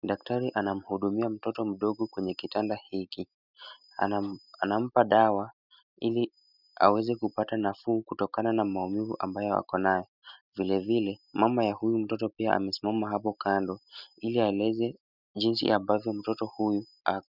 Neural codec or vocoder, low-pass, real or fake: none; 5.4 kHz; real